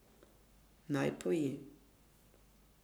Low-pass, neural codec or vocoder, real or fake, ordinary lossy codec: none; codec, 44.1 kHz, 7.8 kbps, Pupu-Codec; fake; none